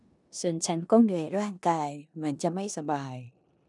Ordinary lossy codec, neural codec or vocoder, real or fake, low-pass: none; codec, 16 kHz in and 24 kHz out, 0.9 kbps, LongCat-Audio-Codec, four codebook decoder; fake; 10.8 kHz